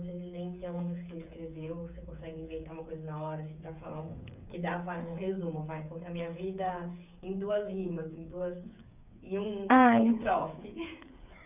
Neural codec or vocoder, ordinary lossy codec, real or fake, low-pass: codec, 16 kHz, 8 kbps, FreqCodec, smaller model; none; fake; 3.6 kHz